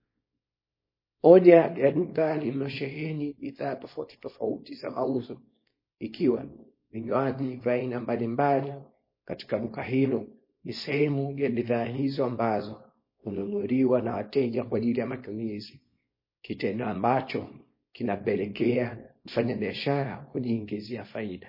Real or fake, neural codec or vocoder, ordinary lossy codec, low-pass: fake; codec, 24 kHz, 0.9 kbps, WavTokenizer, small release; MP3, 24 kbps; 5.4 kHz